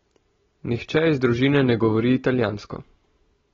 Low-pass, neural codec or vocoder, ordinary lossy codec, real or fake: 7.2 kHz; none; AAC, 24 kbps; real